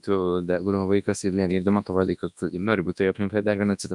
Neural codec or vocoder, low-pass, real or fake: codec, 24 kHz, 0.9 kbps, WavTokenizer, large speech release; 10.8 kHz; fake